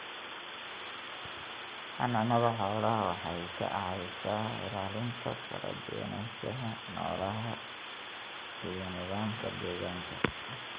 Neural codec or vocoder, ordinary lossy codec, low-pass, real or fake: none; Opus, 32 kbps; 3.6 kHz; real